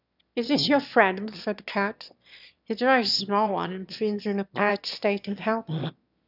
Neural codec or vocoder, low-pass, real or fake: autoencoder, 22.05 kHz, a latent of 192 numbers a frame, VITS, trained on one speaker; 5.4 kHz; fake